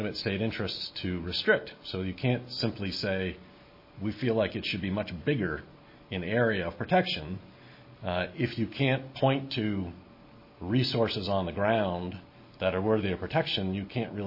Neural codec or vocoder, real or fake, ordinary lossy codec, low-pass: none; real; MP3, 24 kbps; 5.4 kHz